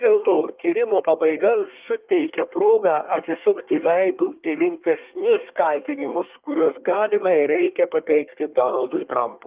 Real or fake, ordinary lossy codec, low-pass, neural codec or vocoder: fake; Opus, 24 kbps; 3.6 kHz; codec, 24 kHz, 1 kbps, SNAC